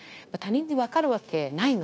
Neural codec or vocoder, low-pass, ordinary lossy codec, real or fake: codec, 16 kHz, 0.9 kbps, LongCat-Audio-Codec; none; none; fake